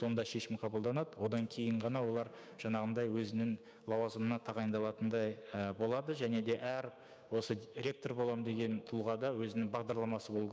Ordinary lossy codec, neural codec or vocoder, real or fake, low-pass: none; codec, 16 kHz, 6 kbps, DAC; fake; none